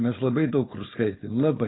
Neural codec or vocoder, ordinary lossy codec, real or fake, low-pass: codec, 16 kHz, 4.8 kbps, FACodec; AAC, 16 kbps; fake; 7.2 kHz